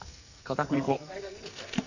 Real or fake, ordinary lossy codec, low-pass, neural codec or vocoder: fake; none; 7.2 kHz; codec, 44.1 kHz, 2.6 kbps, SNAC